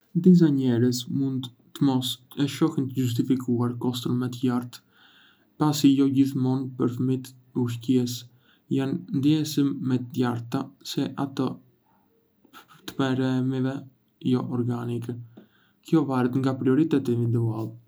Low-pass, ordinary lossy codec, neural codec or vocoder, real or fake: none; none; none; real